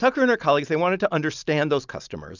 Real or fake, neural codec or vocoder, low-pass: real; none; 7.2 kHz